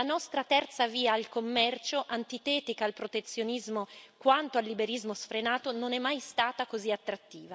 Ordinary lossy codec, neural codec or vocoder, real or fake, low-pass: none; none; real; none